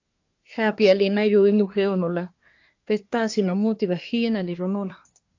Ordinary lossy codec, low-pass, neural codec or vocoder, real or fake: AAC, 48 kbps; 7.2 kHz; codec, 24 kHz, 1 kbps, SNAC; fake